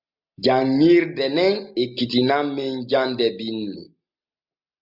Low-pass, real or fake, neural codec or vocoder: 5.4 kHz; real; none